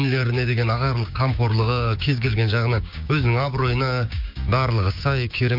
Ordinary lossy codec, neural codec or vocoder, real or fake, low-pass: MP3, 48 kbps; codec, 16 kHz, 6 kbps, DAC; fake; 5.4 kHz